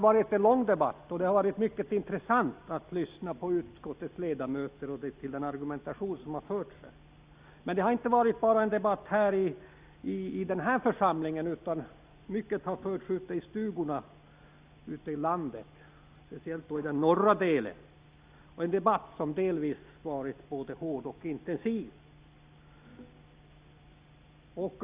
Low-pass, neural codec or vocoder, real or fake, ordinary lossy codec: 3.6 kHz; none; real; Opus, 64 kbps